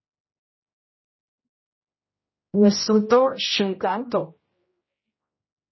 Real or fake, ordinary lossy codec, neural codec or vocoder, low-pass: fake; MP3, 24 kbps; codec, 16 kHz, 0.5 kbps, X-Codec, HuBERT features, trained on general audio; 7.2 kHz